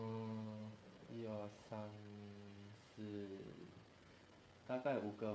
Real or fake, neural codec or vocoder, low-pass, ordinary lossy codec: fake; codec, 16 kHz, 16 kbps, FreqCodec, smaller model; none; none